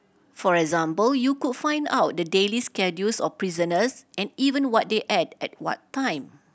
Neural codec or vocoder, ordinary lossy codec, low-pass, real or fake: none; none; none; real